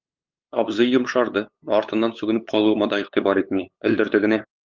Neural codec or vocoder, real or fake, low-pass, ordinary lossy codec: codec, 16 kHz, 8 kbps, FunCodec, trained on LibriTTS, 25 frames a second; fake; 7.2 kHz; Opus, 24 kbps